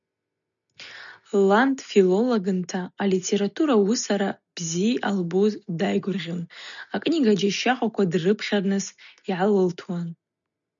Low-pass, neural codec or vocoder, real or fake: 7.2 kHz; none; real